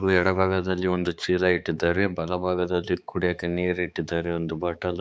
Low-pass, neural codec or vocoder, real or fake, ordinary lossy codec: none; codec, 16 kHz, 4 kbps, X-Codec, HuBERT features, trained on balanced general audio; fake; none